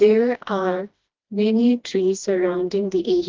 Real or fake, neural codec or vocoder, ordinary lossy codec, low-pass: fake; codec, 16 kHz, 1 kbps, FreqCodec, smaller model; Opus, 32 kbps; 7.2 kHz